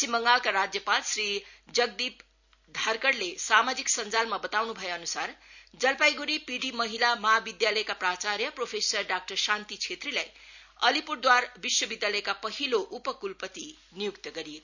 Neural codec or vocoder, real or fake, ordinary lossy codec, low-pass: none; real; none; 7.2 kHz